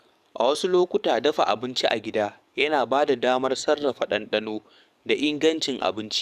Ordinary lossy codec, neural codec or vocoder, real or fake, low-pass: none; codec, 44.1 kHz, 7.8 kbps, DAC; fake; 14.4 kHz